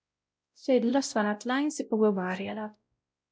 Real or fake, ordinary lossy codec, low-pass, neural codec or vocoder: fake; none; none; codec, 16 kHz, 0.5 kbps, X-Codec, WavLM features, trained on Multilingual LibriSpeech